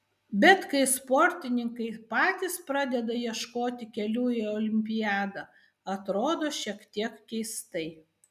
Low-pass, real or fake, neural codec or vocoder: 14.4 kHz; real; none